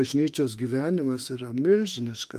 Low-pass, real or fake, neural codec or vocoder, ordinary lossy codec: 14.4 kHz; fake; autoencoder, 48 kHz, 32 numbers a frame, DAC-VAE, trained on Japanese speech; Opus, 32 kbps